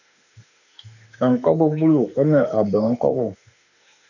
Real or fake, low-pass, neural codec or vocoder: fake; 7.2 kHz; autoencoder, 48 kHz, 32 numbers a frame, DAC-VAE, trained on Japanese speech